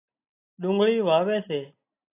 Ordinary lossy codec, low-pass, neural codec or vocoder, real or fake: AAC, 24 kbps; 3.6 kHz; none; real